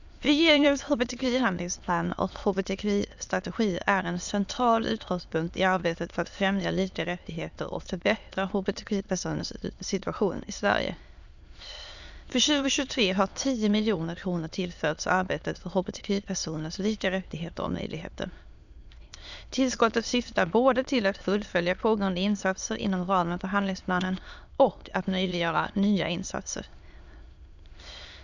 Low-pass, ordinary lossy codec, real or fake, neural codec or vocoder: 7.2 kHz; none; fake; autoencoder, 22.05 kHz, a latent of 192 numbers a frame, VITS, trained on many speakers